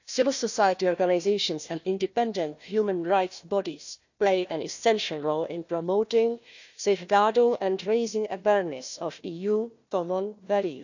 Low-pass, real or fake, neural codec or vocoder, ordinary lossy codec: 7.2 kHz; fake; codec, 16 kHz, 1 kbps, FunCodec, trained on Chinese and English, 50 frames a second; none